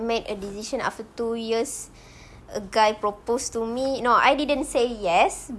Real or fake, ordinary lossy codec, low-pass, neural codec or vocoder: real; none; none; none